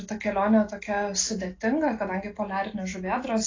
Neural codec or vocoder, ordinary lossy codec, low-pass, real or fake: none; AAC, 32 kbps; 7.2 kHz; real